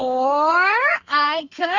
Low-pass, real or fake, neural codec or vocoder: 7.2 kHz; fake; codec, 32 kHz, 1.9 kbps, SNAC